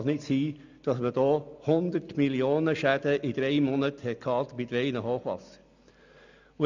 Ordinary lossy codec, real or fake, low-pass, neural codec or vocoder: none; real; 7.2 kHz; none